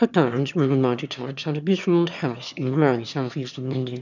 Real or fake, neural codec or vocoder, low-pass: fake; autoencoder, 22.05 kHz, a latent of 192 numbers a frame, VITS, trained on one speaker; 7.2 kHz